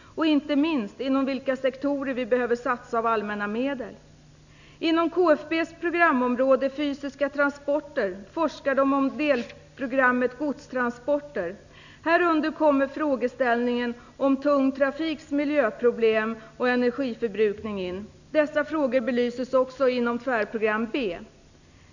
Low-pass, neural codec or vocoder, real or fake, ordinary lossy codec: 7.2 kHz; none; real; none